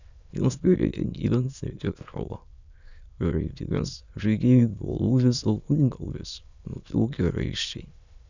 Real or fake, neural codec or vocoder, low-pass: fake; autoencoder, 22.05 kHz, a latent of 192 numbers a frame, VITS, trained on many speakers; 7.2 kHz